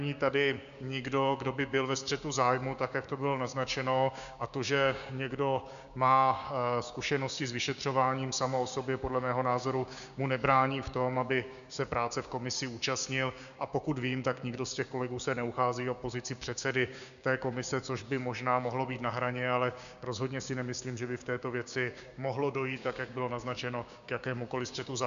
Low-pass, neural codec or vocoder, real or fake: 7.2 kHz; codec, 16 kHz, 6 kbps, DAC; fake